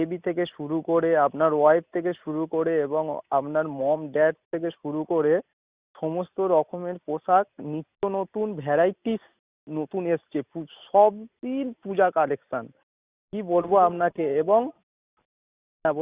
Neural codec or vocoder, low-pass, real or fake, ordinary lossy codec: none; 3.6 kHz; real; Opus, 64 kbps